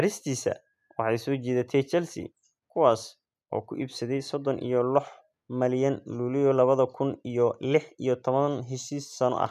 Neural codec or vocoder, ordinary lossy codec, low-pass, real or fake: none; none; 14.4 kHz; real